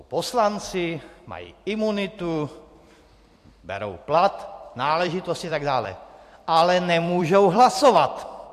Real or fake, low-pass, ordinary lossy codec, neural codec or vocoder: fake; 14.4 kHz; AAC, 64 kbps; vocoder, 44.1 kHz, 128 mel bands every 256 samples, BigVGAN v2